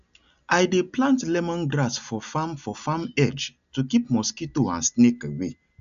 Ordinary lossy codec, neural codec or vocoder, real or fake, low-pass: AAC, 96 kbps; none; real; 7.2 kHz